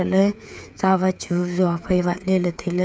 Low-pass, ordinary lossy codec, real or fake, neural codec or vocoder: none; none; fake; codec, 16 kHz, 8 kbps, FreqCodec, smaller model